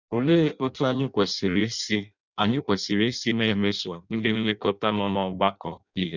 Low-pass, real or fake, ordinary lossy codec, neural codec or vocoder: 7.2 kHz; fake; none; codec, 16 kHz in and 24 kHz out, 0.6 kbps, FireRedTTS-2 codec